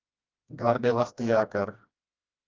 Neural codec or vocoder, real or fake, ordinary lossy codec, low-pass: codec, 16 kHz, 1 kbps, FreqCodec, smaller model; fake; Opus, 24 kbps; 7.2 kHz